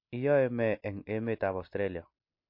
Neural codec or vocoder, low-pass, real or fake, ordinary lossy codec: none; 5.4 kHz; real; MP3, 32 kbps